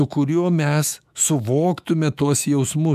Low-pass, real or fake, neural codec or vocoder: 14.4 kHz; fake; autoencoder, 48 kHz, 128 numbers a frame, DAC-VAE, trained on Japanese speech